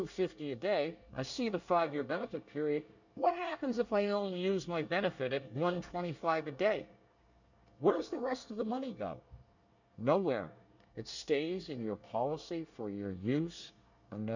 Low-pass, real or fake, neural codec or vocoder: 7.2 kHz; fake; codec, 24 kHz, 1 kbps, SNAC